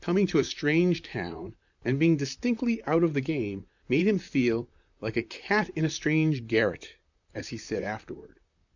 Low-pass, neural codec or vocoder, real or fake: 7.2 kHz; vocoder, 44.1 kHz, 128 mel bands, Pupu-Vocoder; fake